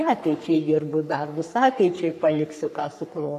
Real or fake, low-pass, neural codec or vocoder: fake; 14.4 kHz; codec, 44.1 kHz, 3.4 kbps, Pupu-Codec